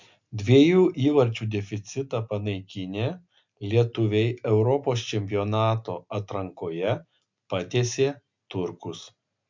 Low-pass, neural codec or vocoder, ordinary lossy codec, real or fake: 7.2 kHz; none; MP3, 64 kbps; real